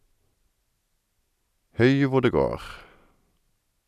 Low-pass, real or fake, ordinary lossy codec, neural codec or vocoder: 14.4 kHz; real; none; none